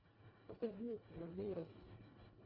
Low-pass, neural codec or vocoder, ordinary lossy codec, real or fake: 5.4 kHz; codec, 24 kHz, 1.5 kbps, HILCodec; Opus, 64 kbps; fake